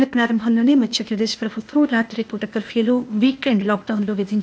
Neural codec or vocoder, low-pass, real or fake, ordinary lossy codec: codec, 16 kHz, 0.8 kbps, ZipCodec; none; fake; none